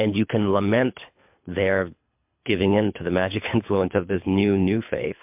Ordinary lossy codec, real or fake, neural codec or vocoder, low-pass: MP3, 32 kbps; fake; codec, 24 kHz, 3 kbps, HILCodec; 3.6 kHz